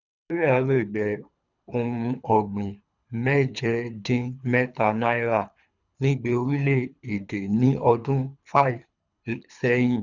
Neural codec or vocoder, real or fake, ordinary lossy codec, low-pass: codec, 24 kHz, 3 kbps, HILCodec; fake; none; 7.2 kHz